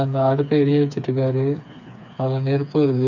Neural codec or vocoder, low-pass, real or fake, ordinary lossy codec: codec, 16 kHz, 4 kbps, FreqCodec, smaller model; 7.2 kHz; fake; none